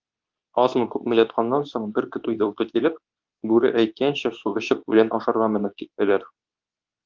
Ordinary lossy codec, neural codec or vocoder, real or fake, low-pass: Opus, 32 kbps; codec, 24 kHz, 0.9 kbps, WavTokenizer, medium speech release version 2; fake; 7.2 kHz